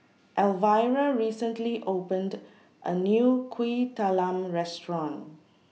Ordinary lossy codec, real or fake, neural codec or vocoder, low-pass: none; real; none; none